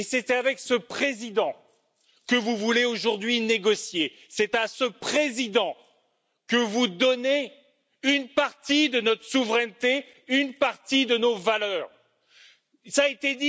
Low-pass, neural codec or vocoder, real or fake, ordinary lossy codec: none; none; real; none